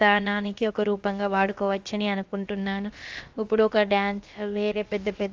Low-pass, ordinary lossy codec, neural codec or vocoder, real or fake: 7.2 kHz; Opus, 32 kbps; codec, 16 kHz, about 1 kbps, DyCAST, with the encoder's durations; fake